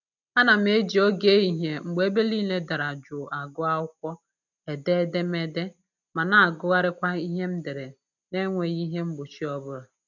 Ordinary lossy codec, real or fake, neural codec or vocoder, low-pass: none; real; none; 7.2 kHz